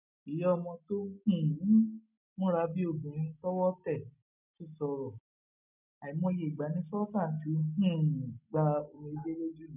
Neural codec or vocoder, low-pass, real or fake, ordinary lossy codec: none; 3.6 kHz; real; none